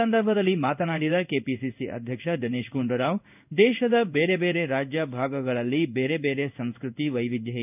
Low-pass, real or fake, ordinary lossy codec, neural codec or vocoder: 3.6 kHz; fake; none; codec, 16 kHz in and 24 kHz out, 1 kbps, XY-Tokenizer